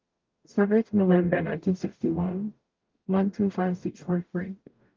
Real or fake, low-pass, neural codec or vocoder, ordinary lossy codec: fake; 7.2 kHz; codec, 44.1 kHz, 0.9 kbps, DAC; Opus, 32 kbps